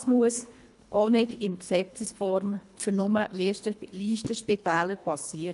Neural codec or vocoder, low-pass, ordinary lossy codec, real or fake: codec, 24 kHz, 1.5 kbps, HILCodec; 10.8 kHz; MP3, 64 kbps; fake